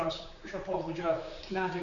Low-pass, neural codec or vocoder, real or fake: 7.2 kHz; codec, 16 kHz, 4 kbps, X-Codec, HuBERT features, trained on general audio; fake